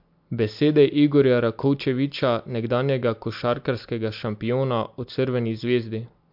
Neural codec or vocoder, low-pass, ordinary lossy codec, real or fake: none; 5.4 kHz; MP3, 48 kbps; real